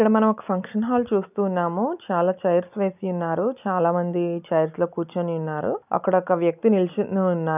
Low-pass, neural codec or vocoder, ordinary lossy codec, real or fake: 3.6 kHz; none; none; real